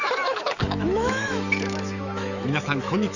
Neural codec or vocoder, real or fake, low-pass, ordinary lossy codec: autoencoder, 48 kHz, 128 numbers a frame, DAC-VAE, trained on Japanese speech; fake; 7.2 kHz; none